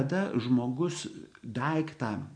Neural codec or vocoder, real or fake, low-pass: none; real; 9.9 kHz